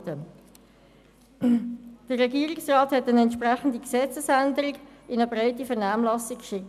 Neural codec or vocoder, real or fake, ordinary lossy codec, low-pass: none; real; none; 14.4 kHz